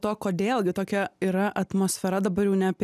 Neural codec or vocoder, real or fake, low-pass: none; real; 14.4 kHz